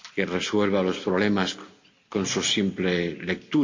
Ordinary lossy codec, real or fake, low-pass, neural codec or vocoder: MP3, 48 kbps; real; 7.2 kHz; none